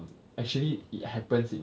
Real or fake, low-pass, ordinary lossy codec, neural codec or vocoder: real; none; none; none